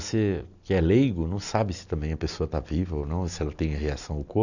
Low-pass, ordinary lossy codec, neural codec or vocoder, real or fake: 7.2 kHz; none; none; real